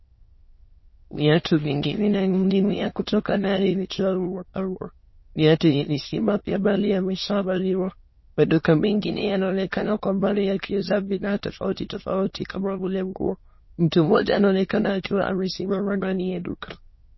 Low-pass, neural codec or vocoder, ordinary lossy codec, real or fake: 7.2 kHz; autoencoder, 22.05 kHz, a latent of 192 numbers a frame, VITS, trained on many speakers; MP3, 24 kbps; fake